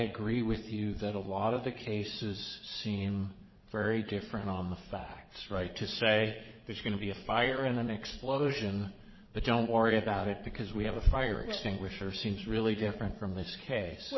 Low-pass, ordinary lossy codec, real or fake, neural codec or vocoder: 7.2 kHz; MP3, 24 kbps; fake; vocoder, 22.05 kHz, 80 mel bands, Vocos